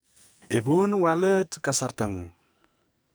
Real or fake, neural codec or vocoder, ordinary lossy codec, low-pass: fake; codec, 44.1 kHz, 2.6 kbps, SNAC; none; none